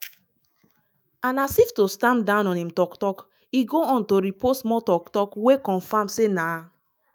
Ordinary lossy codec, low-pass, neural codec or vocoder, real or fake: none; none; autoencoder, 48 kHz, 128 numbers a frame, DAC-VAE, trained on Japanese speech; fake